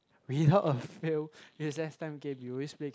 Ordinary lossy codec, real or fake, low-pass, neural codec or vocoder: none; real; none; none